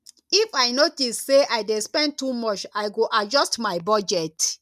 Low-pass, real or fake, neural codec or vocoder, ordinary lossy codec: 14.4 kHz; real; none; none